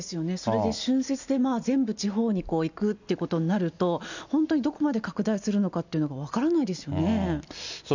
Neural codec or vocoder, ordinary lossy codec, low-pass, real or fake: none; none; 7.2 kHz; real